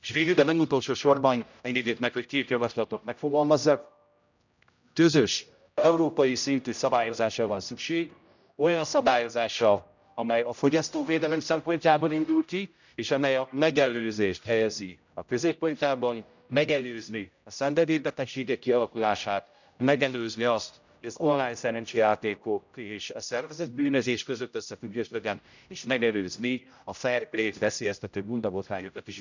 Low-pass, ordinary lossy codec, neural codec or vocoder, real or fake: 7.2 kHz; none; codec, 16 kHz, 0.5 kbps, X-Codec, HuBERT features, trained on general audio; fake